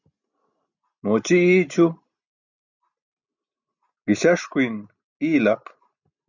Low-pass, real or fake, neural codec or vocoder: 7.2 kHz; real; none